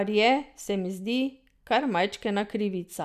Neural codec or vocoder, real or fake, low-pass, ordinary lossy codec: none; real; 14.4 kHz; none